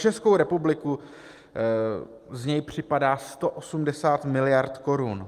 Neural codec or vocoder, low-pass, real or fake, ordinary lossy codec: none; 14.4 kHz; real; Opus, 32 kbps